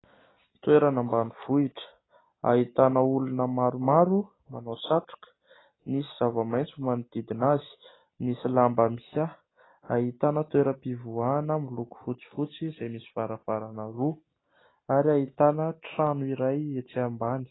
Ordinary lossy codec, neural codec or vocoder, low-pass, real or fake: AAC, 16 kbps; none; 7.2 kHz; real